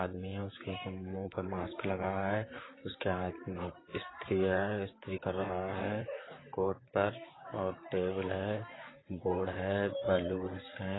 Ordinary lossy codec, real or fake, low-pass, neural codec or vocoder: AAC, 16 kbps; fake; 7.2 kHz; vocoder, 44.1 kHz, 128 mel bands, Pupu-Vocoder